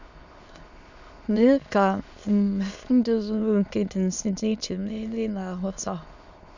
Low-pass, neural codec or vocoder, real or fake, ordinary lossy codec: 7.2 kHz; autoencoder, 22.05 kHz, a latent of 192 numbers a frame, VITS, trained on many speakers; fake; none